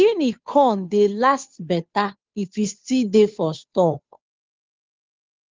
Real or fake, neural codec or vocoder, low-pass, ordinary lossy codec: fake; codec, 16 kHz, 2 kbps, FunCodec, trained on Chinese and English, 25 frames a second; 7.2 kHz; Opus, 32 kbps